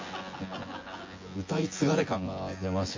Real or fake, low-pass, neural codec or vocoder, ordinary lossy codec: fake; 7.2 kHz; vocoder, 24 kHz, 100 mel bands, Vocos; MP3, 32 kbps